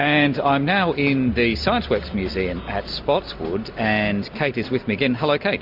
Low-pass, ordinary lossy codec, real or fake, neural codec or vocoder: 5.4 kHz; MP3, 48 kbps; real; none